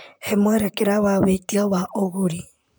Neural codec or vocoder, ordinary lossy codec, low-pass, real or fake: vocoder, 44.1 kHz, 128 mel bands, Pupu-Vocoder; none; none; fake